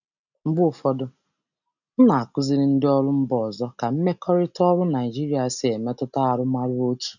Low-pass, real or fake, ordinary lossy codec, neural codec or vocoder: 7.2 kHz; real; none; none